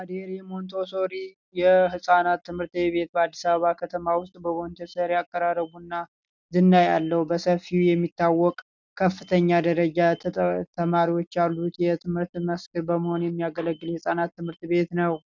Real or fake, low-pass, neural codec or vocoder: real; 7.2 kHz; none